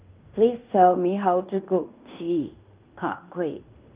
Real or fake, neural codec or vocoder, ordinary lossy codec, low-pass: fake; codec, 16 kHz in and 24 kHz out, 0.9 kbps, LongCat-Audio-Codec, fine tuned four codebook decoder; Opus, 32 kbps; 3.6 kHz